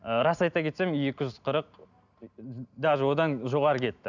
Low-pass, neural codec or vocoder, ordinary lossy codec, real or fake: 7.2 kHz; none; none; real